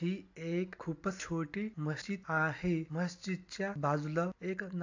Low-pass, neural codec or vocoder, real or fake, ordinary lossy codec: 7.2 kHz; none; real; AAC, 48 kbps